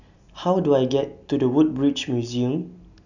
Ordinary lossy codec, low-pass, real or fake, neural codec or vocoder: none; 7.2 kHz; real; none